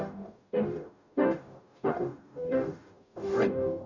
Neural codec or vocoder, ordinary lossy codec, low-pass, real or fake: codec, 44.1 kHz, 0.9 kbps, DAC; none; 7.2 kHz; fake